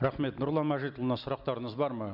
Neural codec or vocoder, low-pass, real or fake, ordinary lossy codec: none; 5.4 kHz; real; none